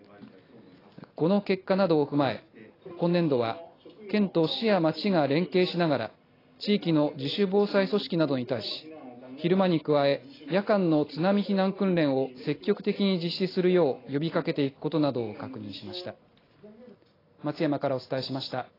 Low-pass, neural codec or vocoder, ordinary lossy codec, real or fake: 5.4 kHz; none; AAC, 24 kbps; real